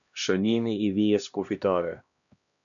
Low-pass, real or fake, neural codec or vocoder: 7.2 kHz; fake; codec, 16 kHz, 1 kbps, X-Codec, HuBERT features, trained on LibriSpeech